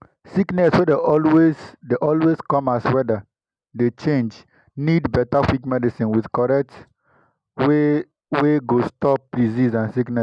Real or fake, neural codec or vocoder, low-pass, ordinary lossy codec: real; none; 9.9 kHz; none